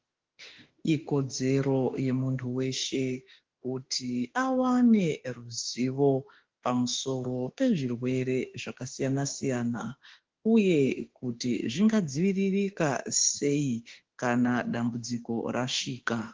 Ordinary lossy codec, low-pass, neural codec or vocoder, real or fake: Opus, 16 kbps; 7.2 kHz; autoencoder, 48 kHz, 32 numbers a frame, DAC-VAE, trained on Japanese speech; fake